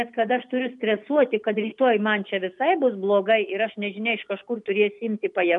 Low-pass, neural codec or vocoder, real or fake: 10.8 kHz; none; real